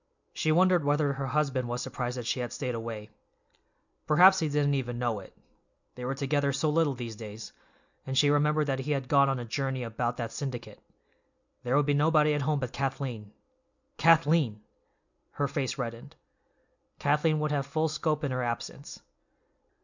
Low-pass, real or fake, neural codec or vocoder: 7.2 kHz; real; none